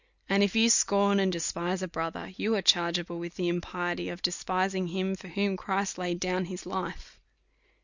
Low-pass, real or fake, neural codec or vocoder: 7.2 kHz; real; none